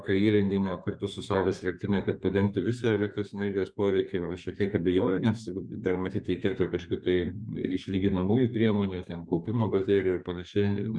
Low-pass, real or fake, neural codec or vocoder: 9.9 kHz; fake; codec, 32 kHz, 1.9 kbps, SNAC